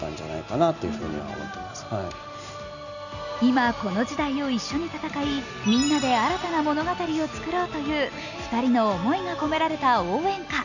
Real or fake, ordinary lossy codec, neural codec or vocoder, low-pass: real; none; none; 7.2 kHz